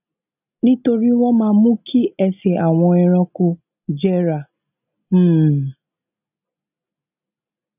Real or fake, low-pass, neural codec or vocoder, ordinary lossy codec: real; 3.6 kHz; none; none